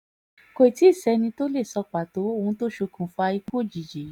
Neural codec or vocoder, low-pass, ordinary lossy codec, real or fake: none; 19.8 kHz; none; real